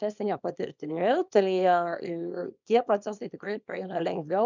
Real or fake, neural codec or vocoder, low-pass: fake; codec, 24 kHz, 0.9 kbps, WavTokenizer, small release; 7.2 kHz